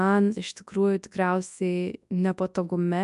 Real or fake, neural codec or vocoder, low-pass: fake; codec, 24 kHz, 0.9 kbps, WavTokenizer, large speech release; 10.8 kHz